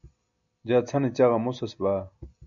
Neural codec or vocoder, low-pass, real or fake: none; 7.2 kHz; real